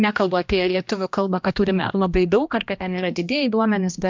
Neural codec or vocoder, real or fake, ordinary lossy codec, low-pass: codec, 16 kHz, 1 kbps, X-Codec, HuBERT features, trained on general audio; fake; MP3, 64 kbps; 7.2 kHz